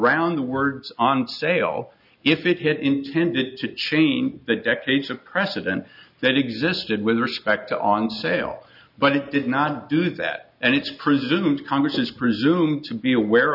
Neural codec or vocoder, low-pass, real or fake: none; 5.4 kHz; real